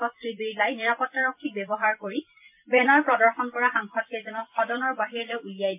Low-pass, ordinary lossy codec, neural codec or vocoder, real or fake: 3.6 kHz; MP3, 32 kbps; vocoder, 44.1 kHz, 128 mel bands every 256 samples, BigVGAN v2; fake